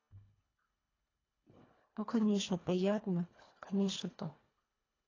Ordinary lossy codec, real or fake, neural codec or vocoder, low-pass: AAC, 32 kbps; fake; codec, 24 kHz, 1.5 kbps, HILCodec; 7.2 kHz